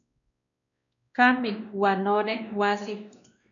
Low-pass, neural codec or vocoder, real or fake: 7.2 kHz; codec, 16 kHz, 1 kbps, X-Codec, WavLM features, trained on Multilingual LibriSpeech; fake